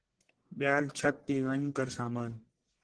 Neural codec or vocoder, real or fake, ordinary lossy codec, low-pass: codec, 44.1 kHz, 1.7 kbps, Pupu-Codec; fake; Opus, 16 kbps; 9.9 kHz